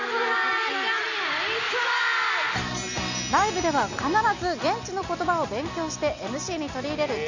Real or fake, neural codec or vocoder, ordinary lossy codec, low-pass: real; none; none; 7.2 kHz